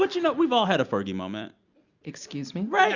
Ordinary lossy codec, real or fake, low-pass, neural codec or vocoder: Opus, 64 kbps; real; 7.2 kHz; none